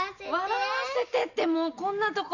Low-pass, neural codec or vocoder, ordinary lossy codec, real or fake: 7.2 kHz; none; none; real